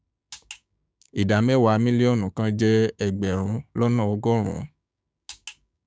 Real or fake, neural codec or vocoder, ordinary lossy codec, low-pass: fake; codec, 16 kHz, 6 kbps, DAC; none; none